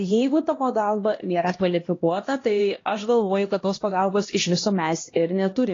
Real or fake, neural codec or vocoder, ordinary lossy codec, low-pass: fake; codec, 16 kHz, 1 kbps, X-Codec, HuBERT features, trained on LibriSpeech; AAC, 32 kbps; 7.2 kHz